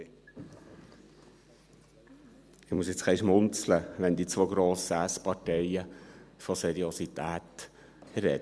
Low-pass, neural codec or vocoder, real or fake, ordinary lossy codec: none; none; real; none